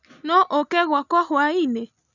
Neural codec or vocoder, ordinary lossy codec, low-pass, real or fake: none; none; 7.2 kHz; real